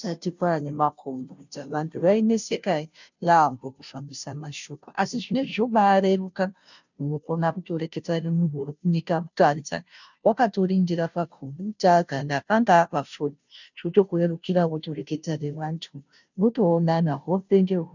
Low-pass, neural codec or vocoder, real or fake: 7.2 kHz; codec, 16 kHz, 0.5 kbps, FunCodec, trained on Chinese and English, 25 frames a second; fake